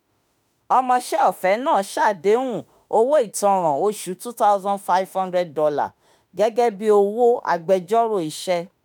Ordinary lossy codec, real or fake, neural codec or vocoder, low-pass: none; fake; autoencoder, 48 kHz, 32 numbers a frame, DAC-VAE, trained on Japanese speech; none